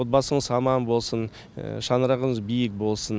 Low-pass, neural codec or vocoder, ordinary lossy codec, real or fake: none; none; none; real